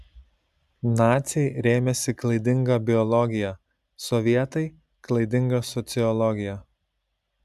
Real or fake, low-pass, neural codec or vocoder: real; 14.4 kHz; none